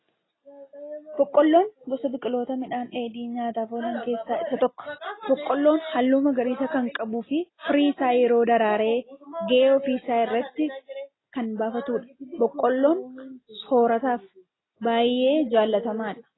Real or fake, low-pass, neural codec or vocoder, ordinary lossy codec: real; 7.2 kHz; none; AAC, 16 kbps